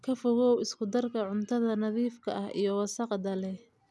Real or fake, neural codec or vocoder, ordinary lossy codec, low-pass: real; none; none; none